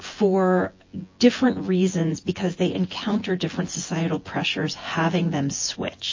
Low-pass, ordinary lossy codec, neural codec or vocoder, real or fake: 7.2 kHz; MP3, 32 kbps; vocoder, 24 kHz, 100 mel bands, Vocos; fake